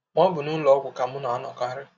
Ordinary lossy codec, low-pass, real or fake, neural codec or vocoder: none; 7.2 kHz; real; none